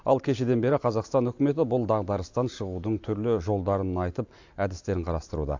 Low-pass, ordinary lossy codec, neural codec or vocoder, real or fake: 7.2 kHz; AAC, 48 kbps; none; real